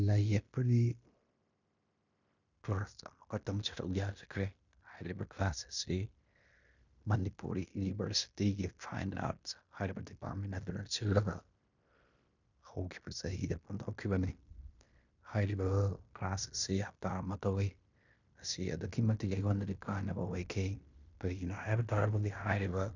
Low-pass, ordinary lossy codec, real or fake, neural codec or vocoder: 7.2 kHz; none; fake; codec, 16 kHz in and 24 kHz out, 0.9 kbps, LongCat-Audio-Codec, fine tuned four codebook decoder